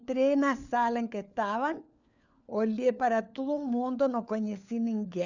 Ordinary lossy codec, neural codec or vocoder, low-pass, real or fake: none; codec, 16 kHz, 4 kbps, FunCodec, trained on LibriTTS, 50 frames a second; 7.2 kHz; fake